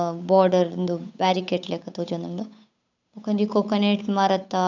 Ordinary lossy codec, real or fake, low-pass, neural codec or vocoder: none; fake; 7.2 kHz; codec, 16 kHz, 8 kbps, FunCodec, trained on Chinese and English, 25 frames a second